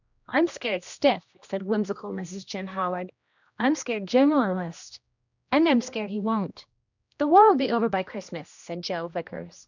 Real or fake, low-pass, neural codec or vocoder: fake; 7.2 kHz; codec, 16 kHz, 1 kbps, X-Codec, HuBERT features, trained on general audio